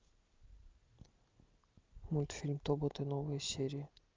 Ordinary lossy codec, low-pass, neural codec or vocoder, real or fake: Opus, 32 kbps; 7.2 kHz; none; real